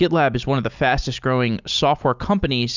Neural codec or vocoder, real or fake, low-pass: none; real; 7.2 kHz